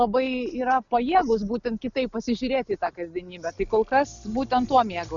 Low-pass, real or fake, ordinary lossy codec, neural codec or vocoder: 7.2 kHz; real; MP3, 96 kbps; none